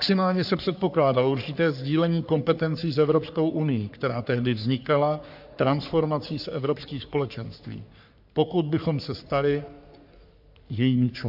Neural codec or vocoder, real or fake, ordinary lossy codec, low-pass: codec, 44.1 kHz, 3.4 kbps, Pupu-Codec; fake; AAC, 48 kbps; 5.4 kHz